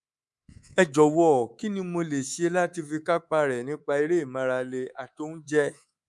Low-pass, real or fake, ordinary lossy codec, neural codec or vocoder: none; fake; none; codec, 24 kHz, 3.1 kbps, DualCodec